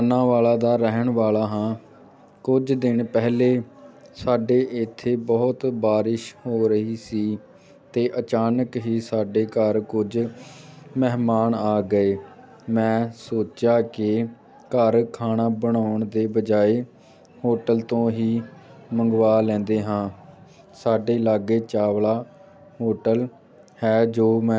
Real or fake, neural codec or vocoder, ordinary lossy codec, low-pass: real; none; none; none